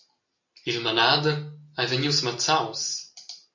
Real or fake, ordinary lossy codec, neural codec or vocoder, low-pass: real; MP3, 48 kbps; none; 7.2 kHz